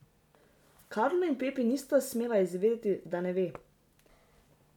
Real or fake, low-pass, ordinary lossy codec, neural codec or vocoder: real; 19.8 kHz; none; none